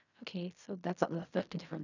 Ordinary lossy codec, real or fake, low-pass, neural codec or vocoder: none; fake; 7.2 kHz; codec, 16 kHz in and 24 kHz out, 0.4 kbps, LongCat-Audio-Codec, fine tuned four codebook decoder